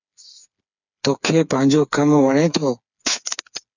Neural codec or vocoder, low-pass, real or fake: codec, 16 kHz, 4 kbps, FreqCodec, smaller model; 7.2 kHz; fake